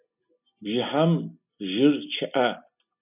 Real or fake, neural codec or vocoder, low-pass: real; none; 3.6 kHz